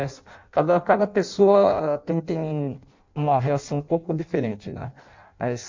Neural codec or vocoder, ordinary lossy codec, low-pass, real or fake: codec, 16 kHz in and 24 kHz out, 0.6 kbps, FireRedTTS-2 codec; MP3, 48 kbps; 7.2 kHz; fake